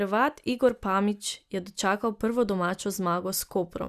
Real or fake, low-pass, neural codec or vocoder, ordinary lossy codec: real; 14.4 kHz; none; Opus, 64 kbps